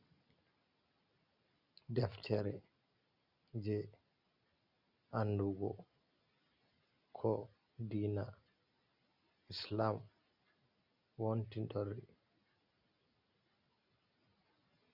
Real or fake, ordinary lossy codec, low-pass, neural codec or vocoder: real; Opus, 64 kbps; 5.4 kHz; none